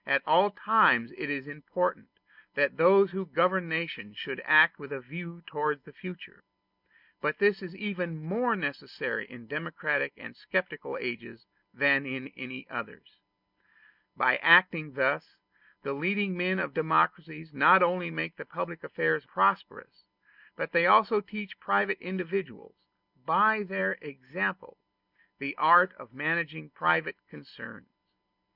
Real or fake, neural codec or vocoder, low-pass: real; none; 5.4 kHz